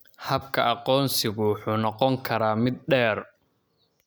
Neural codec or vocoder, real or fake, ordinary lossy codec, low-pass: none; real; none; none